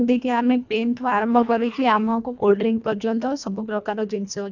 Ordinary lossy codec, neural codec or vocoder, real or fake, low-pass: none; codec, 24 kHz, 1.5 kbps, HILCodec; fake; 7.2 kHz